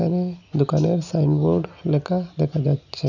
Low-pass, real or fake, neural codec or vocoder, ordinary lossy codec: 7.2 kHz; real; none; none